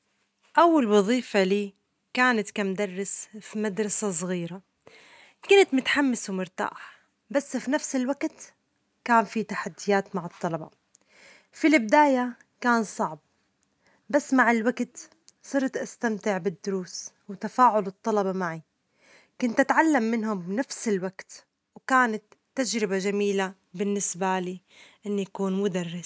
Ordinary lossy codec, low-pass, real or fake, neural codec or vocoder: none; none; real; none